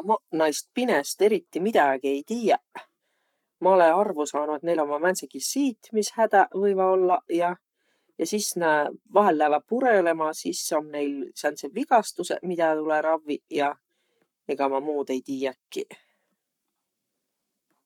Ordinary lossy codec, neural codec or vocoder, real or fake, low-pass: none; codec, 44.1 kHz, 7.8 kbps, Pupu-Codec; fake; 19.8 kHz